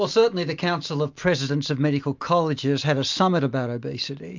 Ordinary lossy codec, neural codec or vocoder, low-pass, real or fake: MP3, 64 kbps; none; 7.2 kHz; real